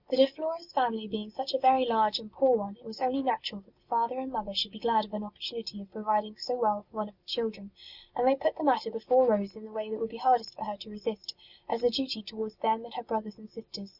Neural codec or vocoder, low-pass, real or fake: none; 5.4 kHz; real